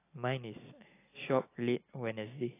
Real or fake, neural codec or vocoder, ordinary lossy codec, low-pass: real; none; AAC, 16 kbps; 3.6 kHz